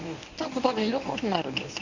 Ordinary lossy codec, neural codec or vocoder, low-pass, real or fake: Opus, 64 kbps; codec, 24 kHz, 0.9 kbps, WavTokenizer, medium speech release version 1; 7.2 kHz; fake